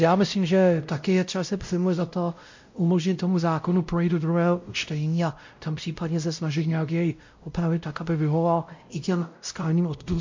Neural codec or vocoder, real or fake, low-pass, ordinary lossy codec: codec, 16 kHz, 0.5 kbps, X-Codec, WavLM features, trained on Multilingual LibriSpeech; fake; 7.2 kHz; MP3, 48 kbps